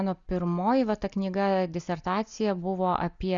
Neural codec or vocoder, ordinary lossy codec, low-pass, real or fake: none; Opus, 64 kbps; 7.2 kHz; real